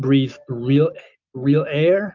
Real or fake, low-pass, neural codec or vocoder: real; 7.2 kHz; none